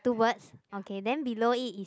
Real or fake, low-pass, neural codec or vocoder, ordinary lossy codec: real; none; none; none